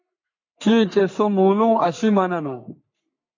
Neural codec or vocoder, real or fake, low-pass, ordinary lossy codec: codec, 44.1 kHz, 3.4 kbps, Pupu-Codec; fake; 7.2 kHz; MP3, 48 kbps